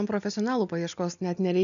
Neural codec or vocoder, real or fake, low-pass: none; real; 7.2 kHz